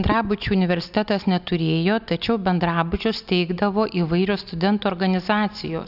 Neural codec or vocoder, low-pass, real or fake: none; 5.4 kHz; real